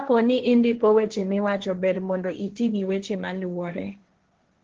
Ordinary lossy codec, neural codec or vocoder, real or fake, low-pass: Opus, 16 kbps; codec, 16 kHz, 1.1 kbps, Voila-Tokenizer; fake; 7.2 kHz